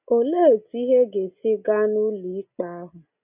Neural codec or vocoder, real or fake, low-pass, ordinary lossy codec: none; real; 3.6 kHz; none